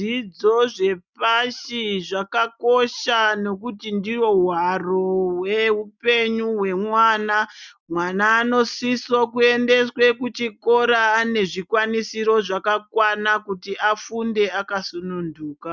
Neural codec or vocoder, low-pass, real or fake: none; 7.2 kHz; real